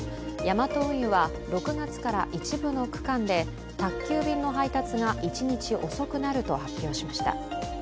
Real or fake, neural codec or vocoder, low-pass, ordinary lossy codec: real; none; none; none